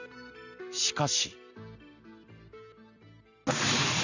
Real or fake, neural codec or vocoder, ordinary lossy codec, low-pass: real; none; none; 7.2 kHz